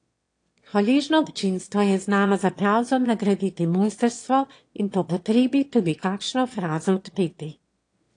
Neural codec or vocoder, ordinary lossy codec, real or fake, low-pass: autoencoder, 22.05 kHz, a latent of 192 numbers a frame, VITS, trained on one speaker; AAC, 48 kbps; fake; 9.9 kHz